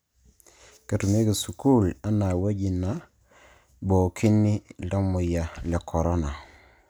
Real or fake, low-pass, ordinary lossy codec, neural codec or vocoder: real; none; none; none